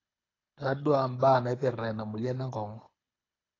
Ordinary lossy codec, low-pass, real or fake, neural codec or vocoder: AAC, 32 kbps; 7.2 kHz; fake; codec, 24 kHz, 6 kbps, HILCodec